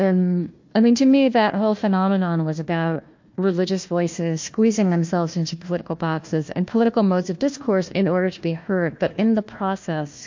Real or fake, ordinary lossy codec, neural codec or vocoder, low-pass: fake; MP3, 48 kbps; codec, 16 kHz, 1 kbps, FunCodec, trained on Chinese and English, 50 frames a second; 7.2 kHz